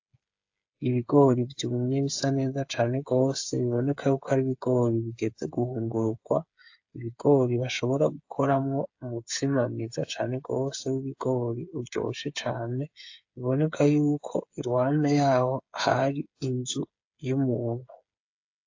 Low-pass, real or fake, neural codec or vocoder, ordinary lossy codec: 7.2 kHz; fake; codec, 16 kHz, 8 kbps, FreqCodec, smaller model; AAC, 48 kbps